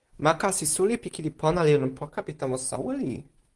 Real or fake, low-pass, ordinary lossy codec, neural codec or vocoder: real; 10.8 kHz; Opus, 24 kbps; none